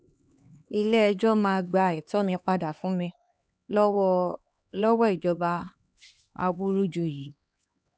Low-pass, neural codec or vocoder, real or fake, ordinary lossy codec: none; codec, 16 kHz, 2 kbps, X-Codec, HuBERT features, trained on LibriSpeech; fake; none